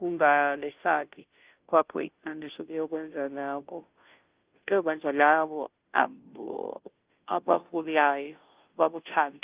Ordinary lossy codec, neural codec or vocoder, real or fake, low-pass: Opus, 24 kbps; codec, 16 kHz, 0.5 kbps, FunCodec, trained on Chinese and English, 25 frames a second; fake; 3.6 kHz